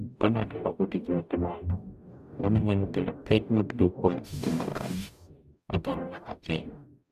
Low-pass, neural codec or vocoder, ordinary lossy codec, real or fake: 14.4 kHz; codec, 44.1 kHz, 0.9 kbps, DAC; none; fake